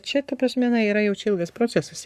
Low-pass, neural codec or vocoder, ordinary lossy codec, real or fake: 14.4 kHz; codec, 44.1 kHz, 7.8 kbps, Pupu-Codec; Opus, 64 kbps; fake